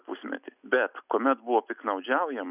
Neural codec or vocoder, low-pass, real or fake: none; 3.6 kHz; real